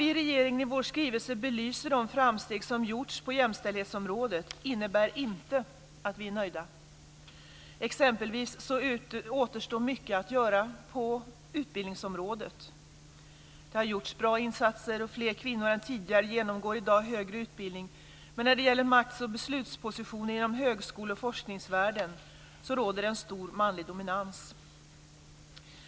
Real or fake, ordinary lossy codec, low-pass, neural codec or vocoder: real; none; none; none